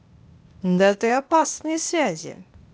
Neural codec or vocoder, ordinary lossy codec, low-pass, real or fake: codec, 16 kHz, 0.8 kbps, ZipCodec; none; none; fake